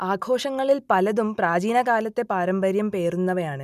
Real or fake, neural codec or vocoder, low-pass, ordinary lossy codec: real; none; 14.4 kHz; none